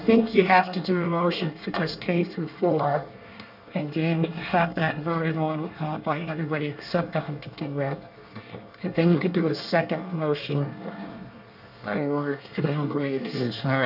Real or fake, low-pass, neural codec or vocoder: fake; 5.4 kHz; codec, 24 kHz, 1 kbps, SNAC